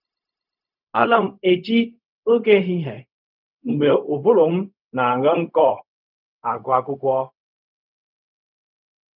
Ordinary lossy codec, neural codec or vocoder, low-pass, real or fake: none; codec, 16 kHz, 0.4 kbps, LongCat-Audio-Codec; 5.4 kHz; fake